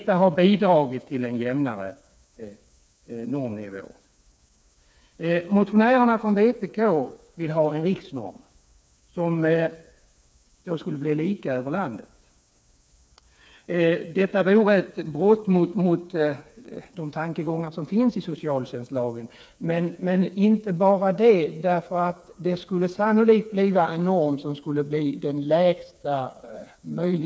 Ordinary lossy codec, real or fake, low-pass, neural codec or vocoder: none; fake; none; codec, 16 kHz, 4 kbps, FreqCodec, smaller model